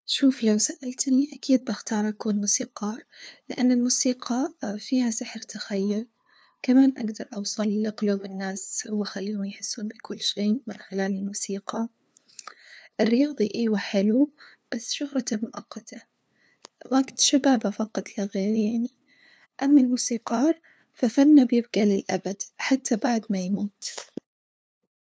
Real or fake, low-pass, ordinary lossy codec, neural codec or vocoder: fake; none; none; codec, 16 kHz, 2 kbps, FunCodec, trained on LibriTTS, 25 frames a second